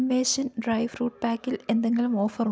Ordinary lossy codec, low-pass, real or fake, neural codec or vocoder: none; none; real; none